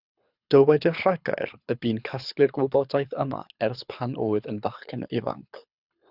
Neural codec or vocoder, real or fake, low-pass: codec, 24 kHz, 6 kbps, HILCodec; fake; 5.4 kHz